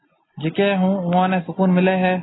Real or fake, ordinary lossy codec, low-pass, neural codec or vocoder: real; AAC, 16 kbps; 7.2 kHz; none